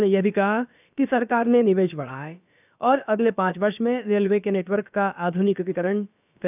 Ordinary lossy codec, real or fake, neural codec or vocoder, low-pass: none; fake; codec, 16 kHz, about 1 kbps, DyCAST, with the encoder's durations; 3.6 kHz